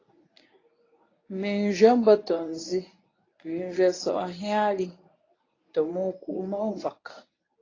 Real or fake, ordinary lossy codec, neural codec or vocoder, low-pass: fake; AAC, 32 kbps; codec, 24 kHz, 0.9 kbps, WavTokenizer, medium speech release version 1; 7.2 kHz